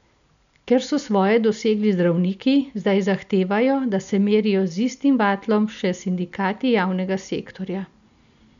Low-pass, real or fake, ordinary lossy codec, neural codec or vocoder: 7.2 kHz; real; none; none